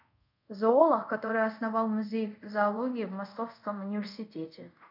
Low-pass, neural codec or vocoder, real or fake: 5.4 kHz; codec, 24 kHz, 0.5 kbps, DualCodec; fake